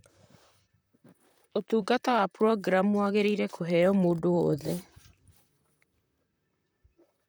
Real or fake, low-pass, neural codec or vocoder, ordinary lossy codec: fake; none; vocoder, 44.1 kHz, 128 mel bands, Pupu-Vocoder; none